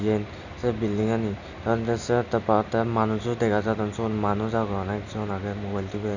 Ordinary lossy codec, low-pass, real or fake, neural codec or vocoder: none; 7.2 kHz; real; none